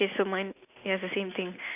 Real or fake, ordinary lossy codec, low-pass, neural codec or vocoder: real; none; 3.6 kHz; none